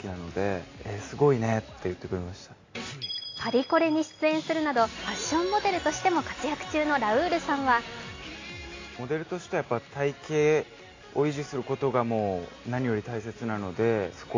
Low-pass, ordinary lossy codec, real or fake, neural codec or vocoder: 7.2 kHz; AAC, 32 kbps; real; none